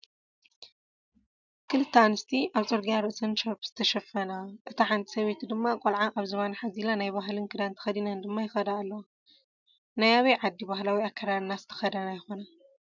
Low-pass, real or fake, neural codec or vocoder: 7.2 kHz; real; none